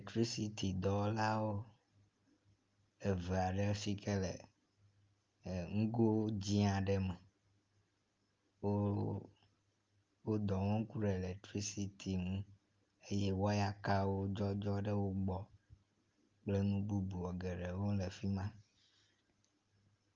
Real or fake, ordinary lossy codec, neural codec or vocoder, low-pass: real; Opus, 24 kbps; none; 7.2 kHz